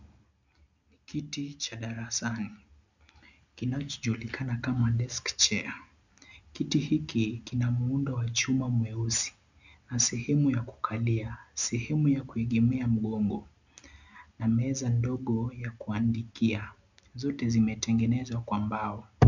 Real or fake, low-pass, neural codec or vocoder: real; 7.2 kHz; none